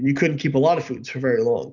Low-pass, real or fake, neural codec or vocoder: 7.2 kHz; real; none